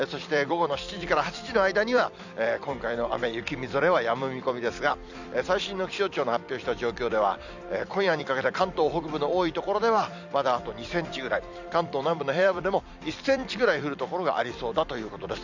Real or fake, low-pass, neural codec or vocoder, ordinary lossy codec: fake; 7.2 kHz; vocoder, 44.1 kHz, 128 mel bands every 256 samples, BigVGAN v2; none